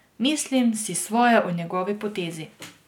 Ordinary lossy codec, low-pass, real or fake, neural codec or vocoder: none; 19.8 kHz; real; none